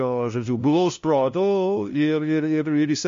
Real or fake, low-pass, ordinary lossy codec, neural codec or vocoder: fake; 7.2 kHz; MP3, 48 kbps; codec, 16 kHz, 0.5 kbps, FunCodec, trained on LibriTTS, 25 frames a second